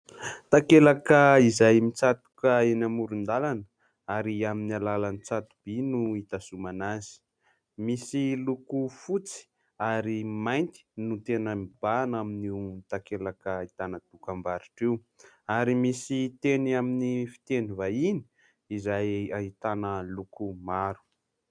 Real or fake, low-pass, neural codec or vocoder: real; 9.9 kHz; none